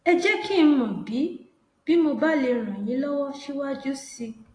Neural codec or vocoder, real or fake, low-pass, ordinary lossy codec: none; real; 9.9 kHz; AAC, 32 kbps